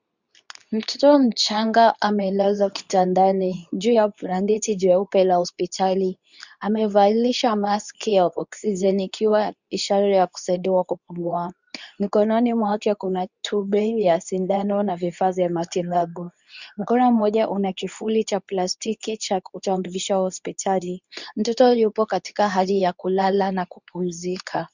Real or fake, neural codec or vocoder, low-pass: fake; codec, 24 kHz, 0.9 kbps, WavTokenizer, medium speech release version 2; 7.2 kHz